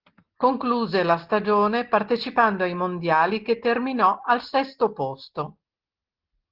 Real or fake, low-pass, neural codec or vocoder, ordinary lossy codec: real; 5.4 kHz; none; Opus, 16 kbps